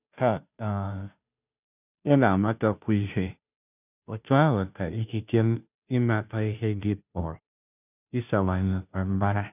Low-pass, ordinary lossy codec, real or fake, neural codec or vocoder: 3.6 kHz; none; fake; codec, 16 kHz, 0.5 kbps, FunCodec, trained on Chinese and English, 25 frames a second